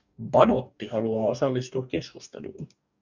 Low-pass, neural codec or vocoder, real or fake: 7.2 kHz; codec, 44.1 kHz, 2.6 kbps, DAC; fake